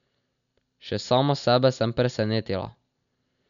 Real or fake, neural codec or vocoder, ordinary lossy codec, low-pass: real; none; none; 7.2 kHz